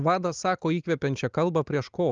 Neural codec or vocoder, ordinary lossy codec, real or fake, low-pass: none; Opus, 24 kbps; real; 7.2 kHz